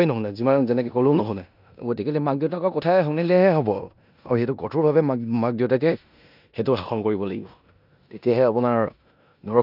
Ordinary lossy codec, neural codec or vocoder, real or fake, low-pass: none; codec, 16 kHz in and 24 kHz out, 0.9 kbps, LongCat-Audio-Codec, four codebook decoder; fake; 5.4 kHz